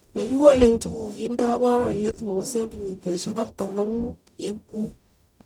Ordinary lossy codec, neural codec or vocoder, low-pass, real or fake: none; codec, 44.1 kHz, 0.9 kbps, DAC; 19.8 kHz; fake